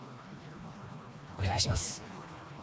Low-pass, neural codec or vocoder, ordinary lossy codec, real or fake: none; codec, 16 kHz, 2 kbps, FreqCodec, smaller model; none; fake